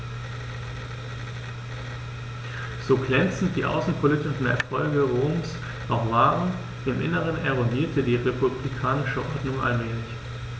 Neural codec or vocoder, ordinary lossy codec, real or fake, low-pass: none; none; real; none